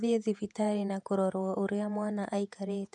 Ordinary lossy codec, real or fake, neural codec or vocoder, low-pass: none; fake; vocoder, 48 kHz, 128 mel bands, Vocos; 10.8 kHz